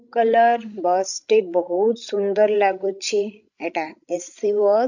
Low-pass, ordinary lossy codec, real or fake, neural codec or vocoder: 7.2 kHz; none; fake; codec, 16 kHz, 16 kbps, FreqCodec, larger model